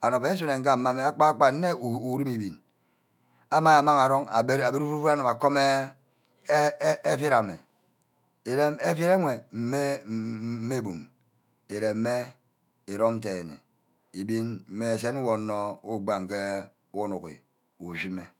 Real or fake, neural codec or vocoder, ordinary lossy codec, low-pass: fake; autoencoder, 48 kHz, 128 numbers a frame, DAC-VAE, trained on Japanese speech; none; 19.8 kHz